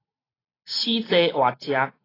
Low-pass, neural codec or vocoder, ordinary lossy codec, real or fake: 5.4 kHz; none; AAC, 24 kbps; real